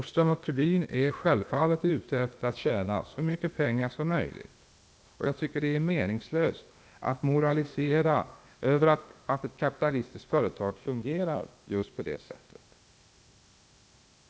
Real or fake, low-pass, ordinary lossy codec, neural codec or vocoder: fake; none; none; codec, 16 kHz, 0.8 kbps, ZipCodec